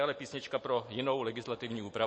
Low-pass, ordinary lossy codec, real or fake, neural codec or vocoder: 9.9 kHz; MP3, 32 kbps; real; none